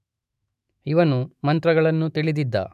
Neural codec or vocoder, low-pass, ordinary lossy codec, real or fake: autoencoder, 48 kHz, 128 numbers a frame, DAC-VAE, trained on Japanese speech; 14.4 kHz; none; fake